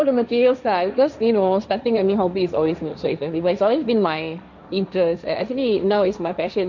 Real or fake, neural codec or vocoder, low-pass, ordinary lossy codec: fake; codec, 16 kHz, 1.1 kbps, Voila-Tokenizer; 7.2 kHz; none